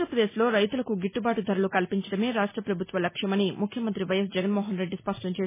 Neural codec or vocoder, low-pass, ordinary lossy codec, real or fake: none; 3.6 kHz; MP3, 16 kbps; real